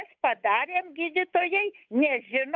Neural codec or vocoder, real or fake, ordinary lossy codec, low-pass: codec, 16 kHz, 8 kbps, FunCodec, trained on Chinese and English, 25 frames a second; fake; MP3, 64 kbps; 7.2 kHz